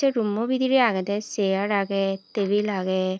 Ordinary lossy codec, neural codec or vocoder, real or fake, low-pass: none; none; real; none